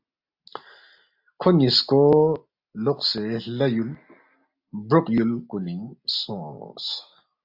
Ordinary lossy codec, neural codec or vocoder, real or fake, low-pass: AAC, 48 kbps; none; real; 5.4 kHz